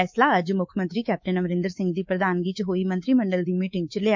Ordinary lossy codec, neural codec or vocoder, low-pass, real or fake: MP3, 48 kbps; codec, 24 kHz, 3.1 kbps, DualCodec; 7.2 kHz; fake